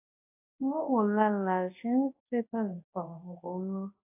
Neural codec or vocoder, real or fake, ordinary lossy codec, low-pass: codec, 24 kHz, 0.9 kbps, WavTokenizer, large speech release; fake; AAC, 24 kbps; 3.6 kHz